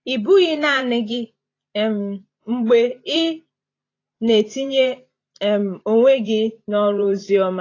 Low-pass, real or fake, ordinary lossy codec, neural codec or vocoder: 7.2 kHz; fake; AAC, 32 kbps; vocoder, 44.1 kHz, 128 mel bands every 512 samples, BigVGAN v2